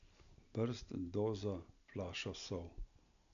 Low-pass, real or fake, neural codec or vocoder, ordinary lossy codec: 7.2 kHz; real; none; none